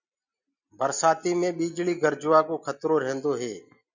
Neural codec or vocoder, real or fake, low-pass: none; real; 7.2 kHz